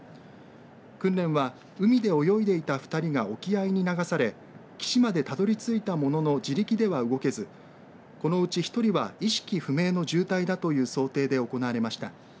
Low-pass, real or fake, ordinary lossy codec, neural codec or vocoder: none; real; none; none